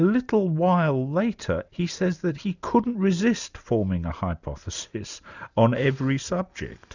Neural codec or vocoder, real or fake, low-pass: none; real; 7.2 kHz